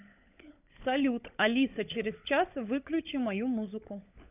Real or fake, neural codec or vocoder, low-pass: fake; codec, 16 kHz, 16 kbps, FunCodec, trained on LibriTTS, 50 frames a second; 3.6 kHz